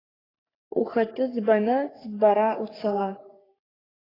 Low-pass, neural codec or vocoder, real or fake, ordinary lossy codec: 5.4 kHz; codec, 44.1 kHz, 3.4 kbps, Pupu-Codec; fake; AAC, 24 kbps